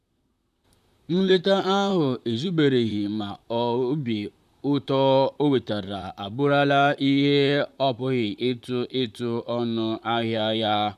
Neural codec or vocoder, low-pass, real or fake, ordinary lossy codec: vocoder, 44.1 kHz, 128 mel bands, Pupu-Vocoder; 14.4 kHz; fake; none